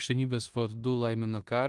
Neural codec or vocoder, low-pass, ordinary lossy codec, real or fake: codec, 16 kHz in and 24 kHz out, 0.9 kbps, LongCat-Audio-Codec, four codebook decoder; 10.8 kHz; Opus, 24 kbps; fake